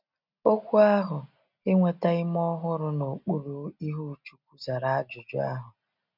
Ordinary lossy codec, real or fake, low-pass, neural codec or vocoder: none; real; 5.4 kHz; none